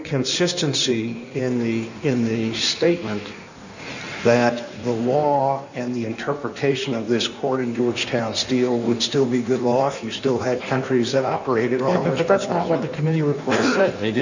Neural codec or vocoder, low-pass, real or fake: codec, 16 kHz in and 24 kHz out, 1.1 kbps, FireRedTTS-2 codec; 7.2 kHz; fake